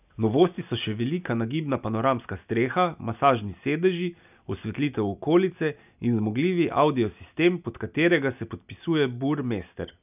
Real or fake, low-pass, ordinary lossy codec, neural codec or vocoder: real; 3.6 kHz; none; none